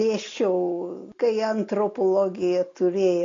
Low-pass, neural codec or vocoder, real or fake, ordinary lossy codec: 7.2 kHz; none; real; AAC, 32 kbps